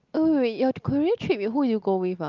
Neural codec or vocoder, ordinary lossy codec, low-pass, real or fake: none; Opus, 32 kbps; 7.2 kHz; real